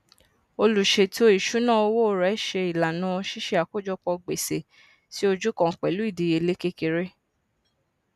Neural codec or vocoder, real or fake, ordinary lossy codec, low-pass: none; real; none; 14.4 kHz